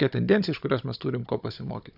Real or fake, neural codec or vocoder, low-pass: fake; codec, 16 kHz, 16 kbps, FunCodec, trained on Chinese and English, 50 frames a second; 5.4 kHz